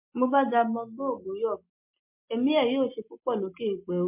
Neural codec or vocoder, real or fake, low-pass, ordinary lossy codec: none; real; 3.6 kHz; MP3, 32 kbps